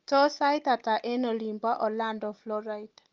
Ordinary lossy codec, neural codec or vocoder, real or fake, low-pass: Opus, 24 kbps; none; real; 7.2 kHz